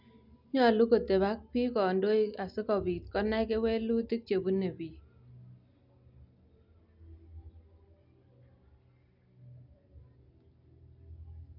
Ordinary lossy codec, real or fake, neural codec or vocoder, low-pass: none; real; none; 5.4 kHz